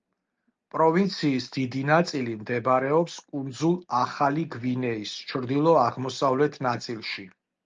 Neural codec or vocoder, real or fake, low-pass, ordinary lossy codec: none; real; 7.2 kHz; Opus, 24 kbps